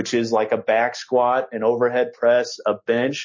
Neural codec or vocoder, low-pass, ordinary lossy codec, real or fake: none; 7.2 kHz; MP3, 32 kbps; real